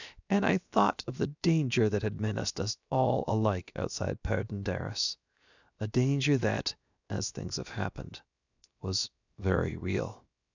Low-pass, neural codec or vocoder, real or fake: 7.2 kHz; codec, 16 kHz, 0.7 kbps, FocalCodec; fake